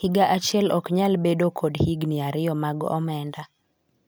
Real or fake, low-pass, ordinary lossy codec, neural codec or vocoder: real; none; none; none